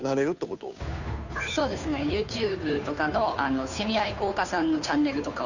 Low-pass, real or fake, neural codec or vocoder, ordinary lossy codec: 7.2 kHz; fake; codec, 16 kHz, 2 kbps, FunCodec, trained on Chinese and English, 25 frames a second; none